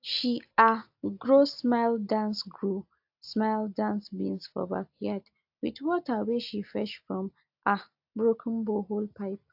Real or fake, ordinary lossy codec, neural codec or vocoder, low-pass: real; AAC, 48 kbps; none; 5.4 kHz